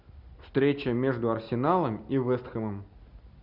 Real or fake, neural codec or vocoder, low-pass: real; none; 5.4 kHz